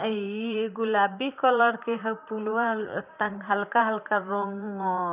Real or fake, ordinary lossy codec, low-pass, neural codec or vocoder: fake; none; 3.6 kHz; vocoder, 44.1 kHz, 128 mel bands, Pupu-Vocoder